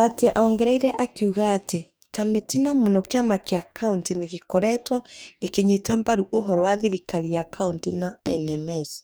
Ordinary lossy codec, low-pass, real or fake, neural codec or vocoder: none; none; fake; codec, 44.1 kHz, 2.6 kbps, DAC